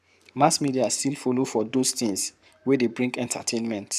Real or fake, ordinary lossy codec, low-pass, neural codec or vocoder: fake; none; 14.4 kHz; codec, 44.1 kHz, 7.8 kbps, Pupu-Codec